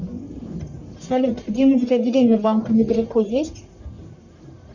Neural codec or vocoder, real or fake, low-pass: codec, 44.1 kHz, 1.7 kbps, Pupu-Codec; fake; 7.2 kHz